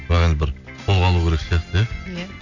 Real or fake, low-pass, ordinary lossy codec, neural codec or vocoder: real; 7.2 kHz; none; none